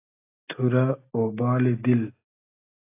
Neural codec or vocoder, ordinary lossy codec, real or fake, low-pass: none; AAC, 24 kbps; real; 3.6 kHz